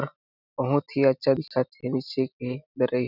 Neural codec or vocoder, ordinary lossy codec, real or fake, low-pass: none; none; real; 5.4 kHz